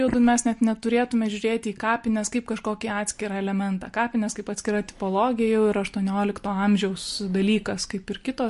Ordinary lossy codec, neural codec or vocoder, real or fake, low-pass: MP3, 48 kbps; none; real; 14.4 kHz